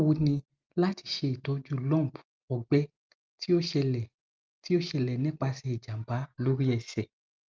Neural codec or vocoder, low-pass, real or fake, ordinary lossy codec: none; 7.2 kHz; real; Opus, 24 kbps